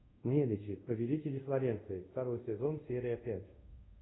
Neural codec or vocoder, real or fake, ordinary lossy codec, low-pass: codec, 24 kHz, 0.5 kbps, DualCodec; fake; AAC, 16 kbps; 7.2 kHz